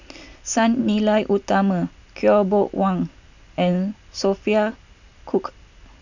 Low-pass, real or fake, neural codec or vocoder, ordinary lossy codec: 7.2 kHz; real; none; none